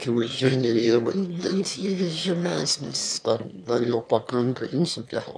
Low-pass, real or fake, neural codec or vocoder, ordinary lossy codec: 9.9 kHz; fake; autoencoder, 22.05 kHz, a latent of 192 numbers a frame, VITS, trained on one speaker; MP3, 96 kbps